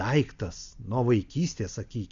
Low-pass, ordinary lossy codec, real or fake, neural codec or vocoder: 7.2 kHz; Opus, 64 kbps; real; none